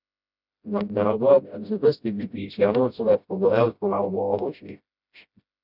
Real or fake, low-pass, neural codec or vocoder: fake; 5.4 kHz; codec, 16 kHz, 0.5 kbps, FreqCodec, smaller model